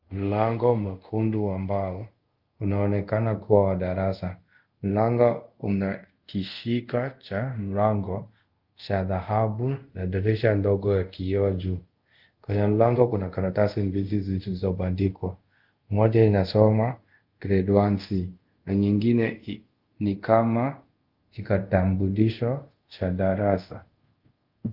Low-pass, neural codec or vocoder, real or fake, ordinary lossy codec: 5.4 kHz; codec, 24 kHz, 0.5 kbps, DualCodec; fake; Opus, 32 kbps